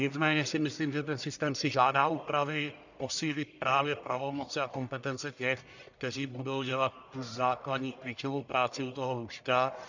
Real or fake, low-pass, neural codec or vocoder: fake; 7.2 kHz; codec, 44.1 kHz, 1.7 kbps, Pupu-Codec